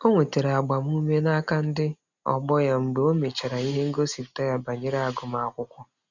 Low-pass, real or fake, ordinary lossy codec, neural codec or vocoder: 7.2 kHz; real; none; none